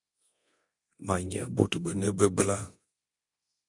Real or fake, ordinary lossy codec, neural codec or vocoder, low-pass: fake; AAC, 64 kbps; codec, 24 kHz, 0.9 kbps, DualCodec; 10.8 kHz